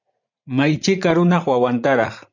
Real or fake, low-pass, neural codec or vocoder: fake; 7.2 kHz; vocoder, 44.1 kHz, 80 mel bands, Vocos